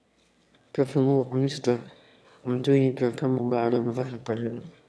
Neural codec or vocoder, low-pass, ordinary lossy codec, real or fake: autoencoder, 22.05 kHz, a latent of 192 numbers a frame, VITS, trained on one speaker; none; none; fake